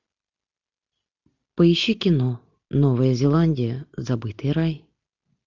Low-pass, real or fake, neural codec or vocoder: 7.2 kHz; real; none